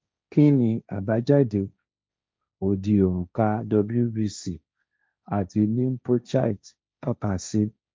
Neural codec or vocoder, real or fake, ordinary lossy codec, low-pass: codec, 16 kHz, 1.1 kbps, Voila-Tokenizer; fake; none; none